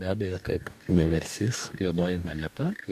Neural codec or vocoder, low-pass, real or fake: codec, 44.1 kHz, 2.6 kbps, DAC; 14.4 kHz; fake